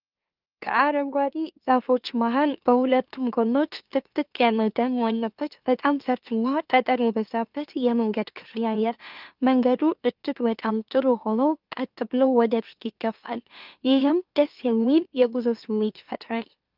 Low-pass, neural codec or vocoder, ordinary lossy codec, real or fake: 5.4 kHz; autoencoder, 44.1 kHz, a latent of 192 numbers a frame, MeloTTS; Opus, 24 kbps; fake